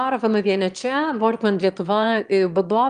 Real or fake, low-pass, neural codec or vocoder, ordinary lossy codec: fake; 9.9 kHz; autoencoder, 22.05 kHz, a latent of 192 numbers a frame, VITS, trained on one speaker; Opus, 32 kbps